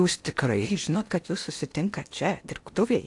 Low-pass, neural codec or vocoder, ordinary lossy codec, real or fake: 10.8 kHz; codec, 16 kHz in and 24 kHz out, 0.8 kbps, FocalCodec, streaming, 65536 codes; AAC, 64 kbps; fake